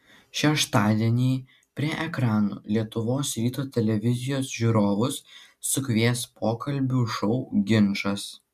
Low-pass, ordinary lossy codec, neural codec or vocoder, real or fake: 14.4 kHz; MP3, 96 kbps; none; real